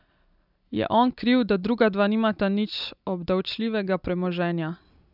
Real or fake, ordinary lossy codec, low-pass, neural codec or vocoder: real; none; 5.4 kHz; none